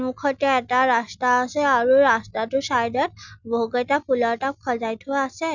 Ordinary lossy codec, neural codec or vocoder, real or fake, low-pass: none; none; real; 7.2 kHz